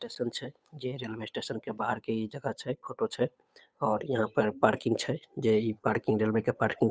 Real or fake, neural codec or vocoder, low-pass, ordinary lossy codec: fake; codec, 16 kHz, 8 kbps, FunCodec, trained on Chinese and English, 25 frames a second; none; none